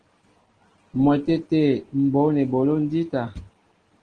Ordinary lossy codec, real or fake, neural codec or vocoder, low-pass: Opus, 16 kbps; real; none; 9.9 kHz